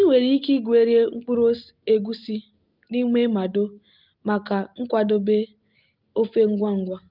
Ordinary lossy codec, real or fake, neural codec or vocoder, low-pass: Opus, 32 kbps; real; none; 5.4 kHz